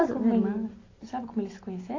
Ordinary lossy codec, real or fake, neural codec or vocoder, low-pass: AAC, 32 kbps; real; none; 7.2 kHz